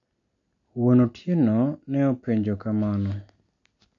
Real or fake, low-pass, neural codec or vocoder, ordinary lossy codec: real; 7.2 kHz; none; AAC, 48 kbps